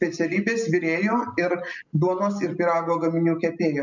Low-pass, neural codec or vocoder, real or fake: 7.2 kHz; none; real